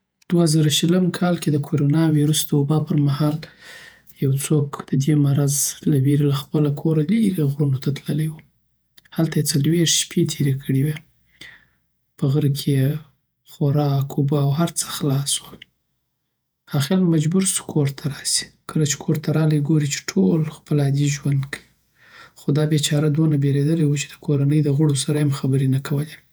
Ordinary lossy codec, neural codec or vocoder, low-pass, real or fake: none; vocoder, 48 kHz, 128 mel bands, Vocos; none; fake